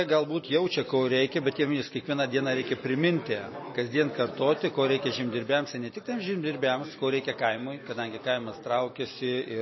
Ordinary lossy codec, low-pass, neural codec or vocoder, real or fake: MP3, 24 kbps; 7.2 kHz; none; real